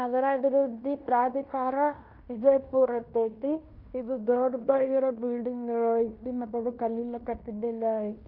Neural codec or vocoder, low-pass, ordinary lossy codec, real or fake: codec, 16 kHz in and 24 kHz out, 0.9 kbps, LongCat-Audio-Codec, fine tuned four codebook decoder; 5.4 kHz; none; fake